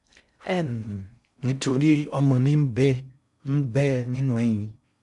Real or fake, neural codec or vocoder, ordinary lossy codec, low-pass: fake; codec, 16 kHz in and 24 kHz out, 0.6 kbps, FocalCodec, streaming, 2048 codes; MP3, 96 kbps; 10.8 kHz